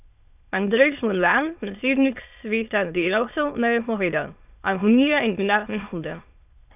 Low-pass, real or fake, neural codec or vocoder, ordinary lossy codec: 3.6 kHz; fake; autoencoder, 22.05 kHz, a latent of 192 numbers a frame, VITS, trained on many speakers; none